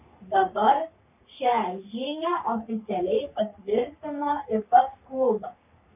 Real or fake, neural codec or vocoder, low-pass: fake; codec, 44.1 kHz, 3.4 kbps, Pupu-Codec; 3.6 kHz